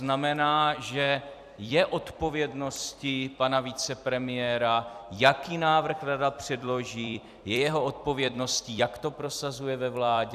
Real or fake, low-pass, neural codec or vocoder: fake; 14.4 kHz; vocoder, 44.1 kHz, 128 mel bands every 256 samples, BigVGAN v2